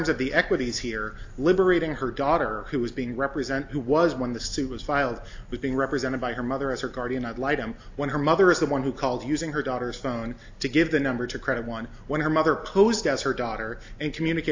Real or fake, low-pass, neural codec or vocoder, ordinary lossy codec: real; 7.2 kHz; none; AAC, 48 kbps